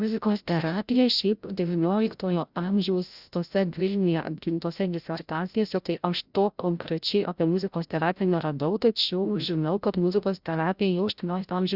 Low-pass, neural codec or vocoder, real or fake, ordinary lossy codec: 5.4 kHz; codec, 16 kHz, 0.5 kbps, FreqCodec, larger model; fake; Opus, 64 kbps